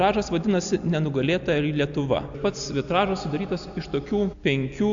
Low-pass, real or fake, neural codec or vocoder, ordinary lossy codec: 7.2 kHz; real; none; AAC, 64 kbps